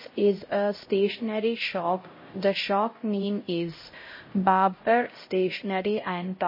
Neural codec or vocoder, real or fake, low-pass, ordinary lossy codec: codec, 16 kHz, 0.5 kbps, X-Codec, HuBERT features, trained on LibriSpeech; fake; 5.4 kHz; MP3, 24 kbps